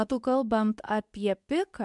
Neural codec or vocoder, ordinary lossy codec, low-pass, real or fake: codec, 24 kHz, 0.9 kbps, WavTokenizer, medium speech release version 2; MP3, 96 kbps; 10.8 kHz; fake